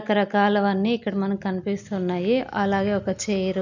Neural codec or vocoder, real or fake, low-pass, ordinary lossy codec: none; real; 7.2 kHz; none